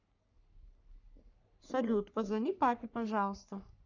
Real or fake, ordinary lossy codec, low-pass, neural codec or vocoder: fake; none; 7.2 kHz; codec, 44.1 kHz, 3.4 kbps, Pupu-Codec